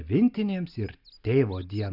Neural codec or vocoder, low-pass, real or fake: none; 5.4 kHz; real